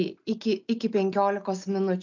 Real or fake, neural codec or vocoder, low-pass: real; none; 7.2 kHz